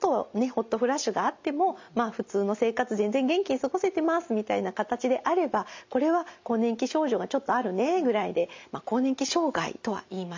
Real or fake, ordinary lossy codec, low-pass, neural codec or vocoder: real; MP3, 64 kbps; 7.2 kHz; none